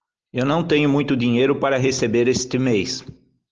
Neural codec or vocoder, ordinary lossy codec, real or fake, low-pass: none; Opus, 24 kbps; real; 7.2 kHz